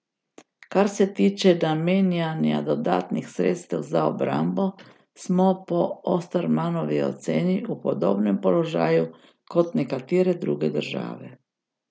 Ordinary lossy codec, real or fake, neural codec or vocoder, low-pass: none; real; none; none